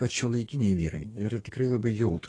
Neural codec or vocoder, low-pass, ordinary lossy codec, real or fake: codec, 32 kHz, 1.9 kbps, SNAC; 9.9 kHz; AAC, 32 kbps; fake